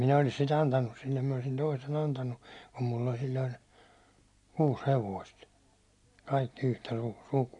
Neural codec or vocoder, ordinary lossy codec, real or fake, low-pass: none; none; real; 10.8 kHz